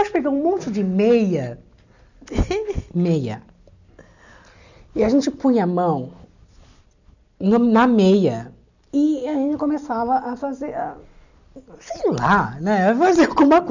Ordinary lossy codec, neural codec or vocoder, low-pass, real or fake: none; none; 7.2 kHz; real